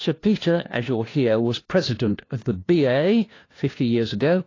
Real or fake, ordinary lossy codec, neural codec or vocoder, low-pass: fake; AAC, 32 kbps; codec, 16 kHz, 1 kbps, FunCodec, trained on LibriTTS, 50 frames a second; 7.2 kHz